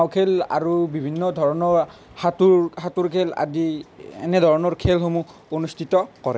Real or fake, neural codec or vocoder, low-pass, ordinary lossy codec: real; none; none; none